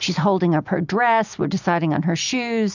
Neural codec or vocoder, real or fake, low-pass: none; real; 7.2 kHz